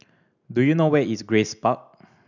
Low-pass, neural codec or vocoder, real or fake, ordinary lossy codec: 7.2 kHz; none; real; none